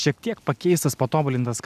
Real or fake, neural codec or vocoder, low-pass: real; none; 14.4 kHz